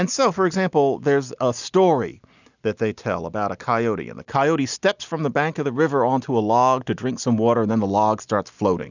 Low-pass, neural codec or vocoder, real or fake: 7.2 kHz; none; real